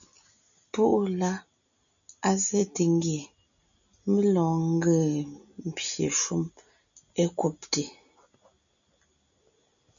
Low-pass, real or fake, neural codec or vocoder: 7.2 kHz; real; none